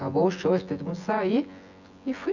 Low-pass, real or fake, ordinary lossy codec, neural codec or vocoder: 7.2 kHz; fake; none; vocoder, 24 kHz, 100 mel bands, Vocos